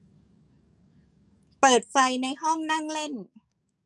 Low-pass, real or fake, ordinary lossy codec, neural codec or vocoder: 10.8 kHz; fake; none; codec, 44.1 kHz, 7.8 kbps, DAC